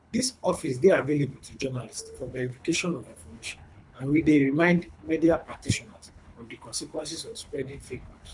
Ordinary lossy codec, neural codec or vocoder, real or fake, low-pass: none; codec, 24 kHz, 3 kbps, HILCodec; fake; 10.8 kHz